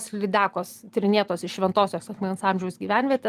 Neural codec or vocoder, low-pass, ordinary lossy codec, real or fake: none; 14.4 kHz; Opus, 32 kbps; real